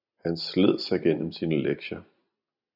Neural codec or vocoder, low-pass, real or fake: none; 5.4 kHz; real